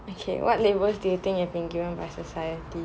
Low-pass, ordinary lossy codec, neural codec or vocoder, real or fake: none; none; none; real